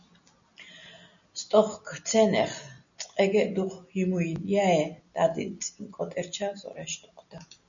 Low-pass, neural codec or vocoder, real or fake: 7.2 kHz; none; real